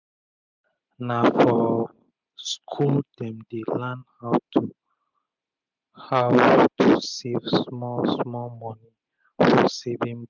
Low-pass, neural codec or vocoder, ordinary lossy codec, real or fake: 7.2 kHz; none; none; real